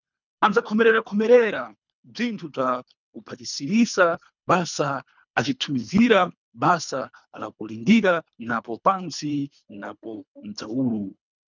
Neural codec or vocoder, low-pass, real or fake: codec, 24 kHz, 3 kbps, HILCodec; 7.2 kHz; fake